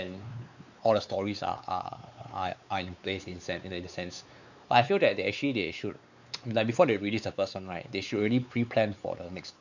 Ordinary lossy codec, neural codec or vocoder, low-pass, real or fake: none; codec, 16 kHz, 4 kbps, X-Codec, WavLM features, trained on Multilingual LibriSpeech; 7.2 kHz; fake